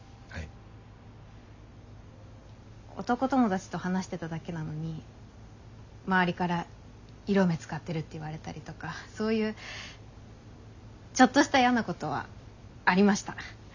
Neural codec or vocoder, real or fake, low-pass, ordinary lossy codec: none; real; 7.2 kHz; none